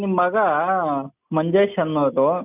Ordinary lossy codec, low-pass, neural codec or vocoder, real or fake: none; 3.6 kHz; none; real